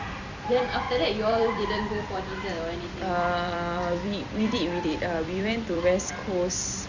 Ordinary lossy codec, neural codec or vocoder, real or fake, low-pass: Opus, 64 kbps; vocoder, 44.1 kHz, 128 mel bands every 256 samples, BigVGAN v2; fake; 7.2 kHz